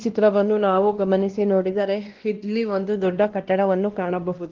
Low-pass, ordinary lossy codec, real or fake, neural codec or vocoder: 7.2 kHz; Opus, 16 kbps; fake; codec, 16 kHz, 1 kbps, X-Codec, WavLM features, trained on Multilingual LibriSpeech